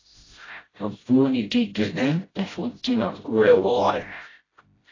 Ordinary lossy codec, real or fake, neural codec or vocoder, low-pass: AAC, 32 kbps; fake; codec, 16 kHz, 0.5 kbps, FreqCodec, smaller model; 7.2 kHz